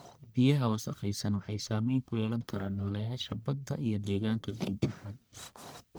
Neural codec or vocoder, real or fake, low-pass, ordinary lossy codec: codec, 44.1 kHz, 1.7 kbps, Pupu-Codec; fake; none; none